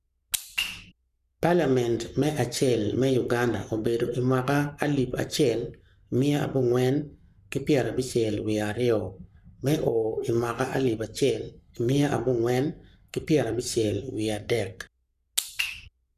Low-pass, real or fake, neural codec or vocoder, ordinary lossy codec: 14.4 kHz; fake; codec, 44.1 kHz, 7.8 kbps, Pupu-Codec; none